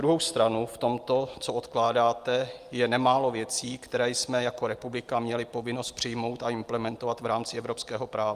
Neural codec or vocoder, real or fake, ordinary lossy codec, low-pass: none; real; Opus, 32 kbps; 14.4 kHz